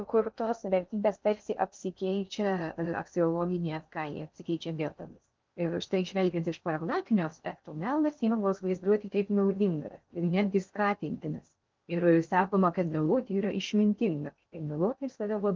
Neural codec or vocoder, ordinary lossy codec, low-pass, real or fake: codec, 16 kHz in and 24 kHz out, 0.6 kbps, FocalCodec, streaming, 2048 codes; Opus, 24 kbps; 7.2 kHz; fake